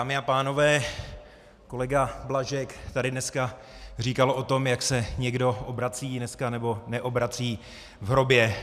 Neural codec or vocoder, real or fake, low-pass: none; real; 14.4 kHz